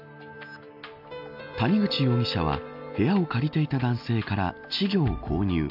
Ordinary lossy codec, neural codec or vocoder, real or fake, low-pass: AAC, 48 kbps; none; real; 5.4 kHz